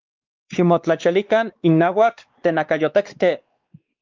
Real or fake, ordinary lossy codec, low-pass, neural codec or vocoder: fake; Opus, 24 kbps; 7.2 kHz; codec, 16 kHz, 2 kbps, X-Codec, WavLM features, trained on Multilingual LibriSpeech